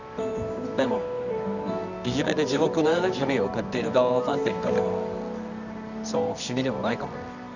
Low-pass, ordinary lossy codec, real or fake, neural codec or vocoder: 7.2 kHz; none; fake; codec, 24 kHz, 0.9 kbps, WavTokenizer, medium music audio release